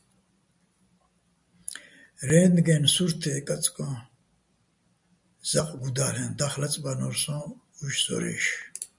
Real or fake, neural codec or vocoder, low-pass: real; none; 10.8 kHz